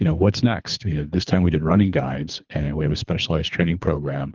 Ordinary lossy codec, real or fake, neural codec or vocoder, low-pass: Opus, 24 kbps; fake; codec, 24 kHz, 3 kbps, HILCodec; 7.2 kHz